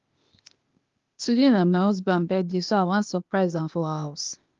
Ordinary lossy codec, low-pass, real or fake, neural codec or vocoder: Opus, 32 kbps; 7.2 kHz; fake; codec, 16 kHz, 0.8 kbps, ZipCodec